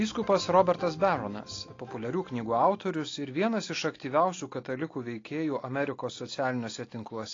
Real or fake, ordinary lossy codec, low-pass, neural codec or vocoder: real; AAC, 32 kbps; 7.2 kHz; none